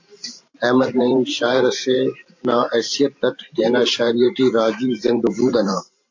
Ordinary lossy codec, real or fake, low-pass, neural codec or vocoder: AAC, 48 kbps; fake; 7.2 kHz; vocoder, 44.1 kHz, 128 mel bands every 512 samples, BigVGAN v2